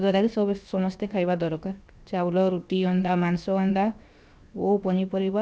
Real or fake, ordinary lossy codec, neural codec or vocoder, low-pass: fake; none; codec, 16 kHz, 0.7 kbps, FocalCodec; none